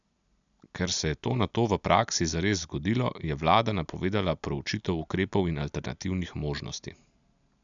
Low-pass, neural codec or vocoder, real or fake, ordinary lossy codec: 7.2 kHz; none; real; none